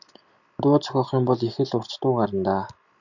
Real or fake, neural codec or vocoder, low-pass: real; none; 7.2 kHz